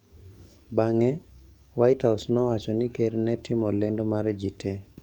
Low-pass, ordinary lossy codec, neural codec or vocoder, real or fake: 19.8 kHz; none; codec, 44.1 kHz, 7.8 kbps, DAC; fake